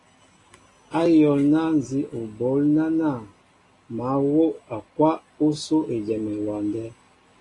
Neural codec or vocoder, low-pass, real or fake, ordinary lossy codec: none; 10.8 kHz; real; AAC, 32 kbps